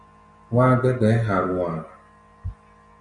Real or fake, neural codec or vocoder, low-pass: real; none; 9.9 kHz